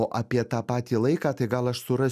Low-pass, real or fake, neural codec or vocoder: 14.4 kHz; real; none